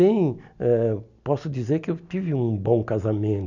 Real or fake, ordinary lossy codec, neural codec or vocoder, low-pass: real; none; none; 7.2 kHz